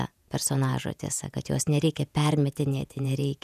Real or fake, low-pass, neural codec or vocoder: fake; 14.4 kHz; vocoder, 48 kHz, 128 mel bands, Vocos